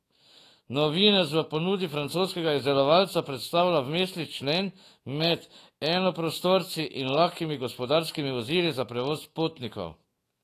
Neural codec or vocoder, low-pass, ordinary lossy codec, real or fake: autoencoder, 48 kHz, 128 numbers a frame, DAC-VAE, trained on Japanese speech; 14.4 kHz; AAC, 48 kbps; fake